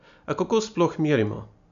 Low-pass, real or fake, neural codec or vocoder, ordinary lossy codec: 7.2 kHz; real; none; none